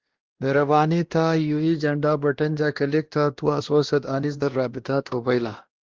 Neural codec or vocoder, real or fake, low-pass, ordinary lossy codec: codec, 16 kHz, 1 kbps, X-Codec, WavLM features, trained on Multilingual LibriSpeech; fake; 7.2 kHz; Opus, 16 kbps